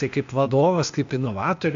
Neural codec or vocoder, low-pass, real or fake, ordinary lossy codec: codec, 16 kHz, 0.8 kbps, ZipCodec; 7.2 kHz; fake; AAC, 64 kbps